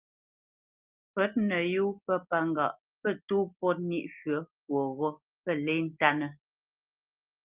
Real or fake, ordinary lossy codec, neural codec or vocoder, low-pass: real; Opus, 32 kbps; none; 3.6 kHz